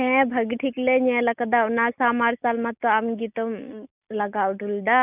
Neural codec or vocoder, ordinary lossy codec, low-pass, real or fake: none; none; 3.6 kHz; real